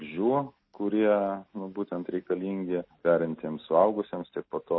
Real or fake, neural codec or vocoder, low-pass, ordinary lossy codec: real; none; 7.2 kHz; MP3, 24 kbps